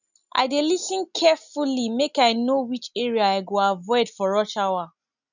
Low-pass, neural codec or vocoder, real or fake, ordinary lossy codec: 7.2 kHz; none; real; none